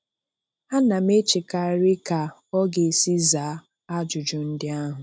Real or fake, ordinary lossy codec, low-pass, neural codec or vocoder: real; none; none; none